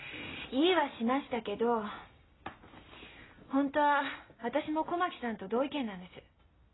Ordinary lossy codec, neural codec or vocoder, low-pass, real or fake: AAC, 16 kbps; none; 7.2 kHz; real